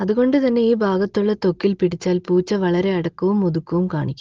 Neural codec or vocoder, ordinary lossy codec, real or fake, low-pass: none; Opus, 16 kbps; real; 7.2 kHz